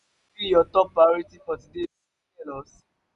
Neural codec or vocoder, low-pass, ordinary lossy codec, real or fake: none; 10.8 kHz; none; real